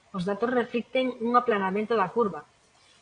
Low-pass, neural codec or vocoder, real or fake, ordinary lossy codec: 9.9 kHz; vocoder, 22.05 kHz, 80 mel bands, Vocos; fake; AAC, 48 kbps